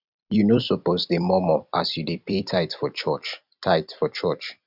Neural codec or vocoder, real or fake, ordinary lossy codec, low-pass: vocoder, 44.1 kHz, 128 mel bands every 256 samples, BigVGAN v2; fake; none; 5.4 kHz